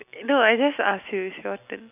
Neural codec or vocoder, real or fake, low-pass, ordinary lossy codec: none; real; 3.6 kHz; none